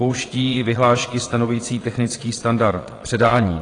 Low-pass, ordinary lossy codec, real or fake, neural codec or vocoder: 9.9 kHz; AAC, 32 kbps; fake; vocoder, 22.05 kHz, 80 mel bands, Vocos